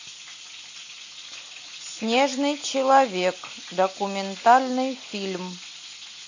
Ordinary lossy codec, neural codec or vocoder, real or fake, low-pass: AAC, 48 kbps; none; real; 7.2 kHz